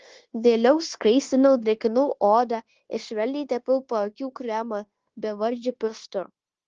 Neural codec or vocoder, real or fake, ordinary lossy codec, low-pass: codec, 16 kHz, 0.9 kbps, LongCat-Audio-Codec; fake; Opus, 16 kbps; 7.2 kHz